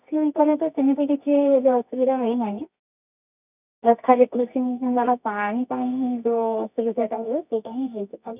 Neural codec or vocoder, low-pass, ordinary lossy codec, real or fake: codec, 24 kHz, 0.9 kbps, WavTokenizer, medium music audio release; 3.6 kHz; none; fake